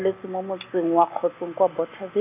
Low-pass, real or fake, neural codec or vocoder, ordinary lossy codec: 3.6 kHz; real; none; none